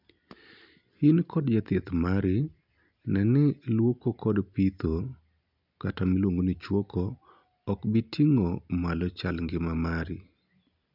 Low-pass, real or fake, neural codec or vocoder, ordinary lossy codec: 5.4 kHz; real; none; none